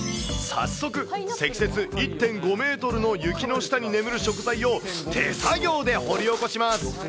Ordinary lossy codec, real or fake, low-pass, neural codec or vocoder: none; real; none; none